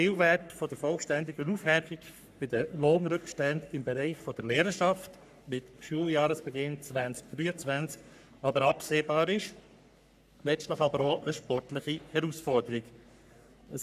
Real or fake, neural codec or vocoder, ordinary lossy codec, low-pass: fake; codec, 44.1 kHz, 3.4 kbps, Pupu-Codec; none; 14.4 kHz